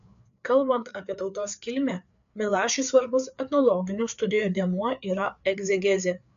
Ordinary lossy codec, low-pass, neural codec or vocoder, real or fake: AAC, 96 kbps; 7.2 kHz; codec, 16 kHz, 4 kbps, FreqCodec, larger model; fake